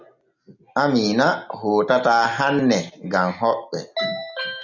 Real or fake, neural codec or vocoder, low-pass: real; none; 7.2 kHz